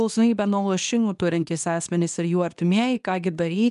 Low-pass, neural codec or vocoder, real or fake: 10.8 kHz; codec, 24 kHz, 0.9 kbps, WavTokenizer, medium speech release version 2; fake